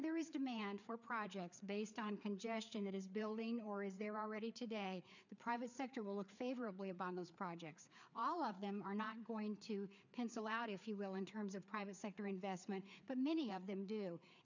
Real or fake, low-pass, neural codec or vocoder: fake; 7.2 kHz; codec, 16 kHz, 4 kbps, FreqCodec, larger model